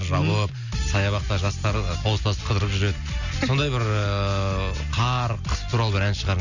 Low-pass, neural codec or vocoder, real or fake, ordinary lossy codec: 7.2 kHz; none; real; none